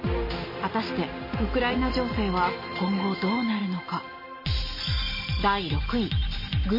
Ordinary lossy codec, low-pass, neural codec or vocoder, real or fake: MP3, 24 kbps; 5.4 kHz; none; real